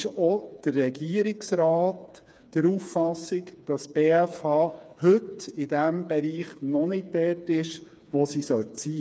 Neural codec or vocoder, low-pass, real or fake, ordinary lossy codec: codec, 16 kHz, 4 kbps, FreqCodec, smaller model; none; fake; none